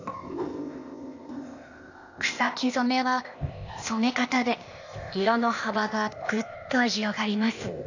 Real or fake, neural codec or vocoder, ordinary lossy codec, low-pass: fake; codec, 16 kHz, 0.8 kbps, ZipCodec; none; 7.2 kHz